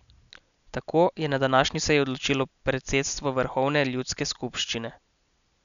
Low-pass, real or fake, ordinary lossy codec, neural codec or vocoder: 7.2 kHz; real; none; none